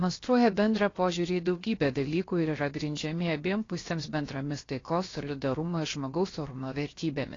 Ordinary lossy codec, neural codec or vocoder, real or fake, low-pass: AAC, 32 kbps; codec, 16 kHz, 0.7 kbps, FocalCodec; fake; 7.2 kHz